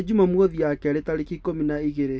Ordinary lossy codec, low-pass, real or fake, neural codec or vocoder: none; none; real; none